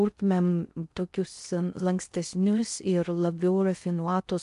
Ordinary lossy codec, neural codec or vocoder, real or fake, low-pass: MP3, 64 kbps; codec, 16 kHz in and 24 kHz out, 0.6 kbps, FocalCodec, streaming, 2048 codes; fake; 10.8 kHz